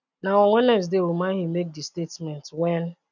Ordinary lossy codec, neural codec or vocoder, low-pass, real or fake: none; none; 7.2 kHz; real